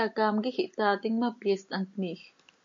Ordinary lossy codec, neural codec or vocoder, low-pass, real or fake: AAC, 64 kbps; none; 7.2 kHz; real